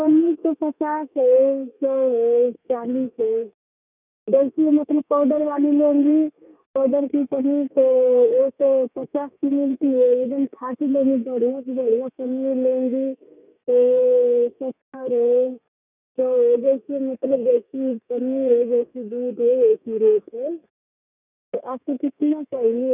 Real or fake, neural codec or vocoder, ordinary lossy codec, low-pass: fake; codec, 32 kHz, 1.9 kbps, SNAC; none; 3.6 kHz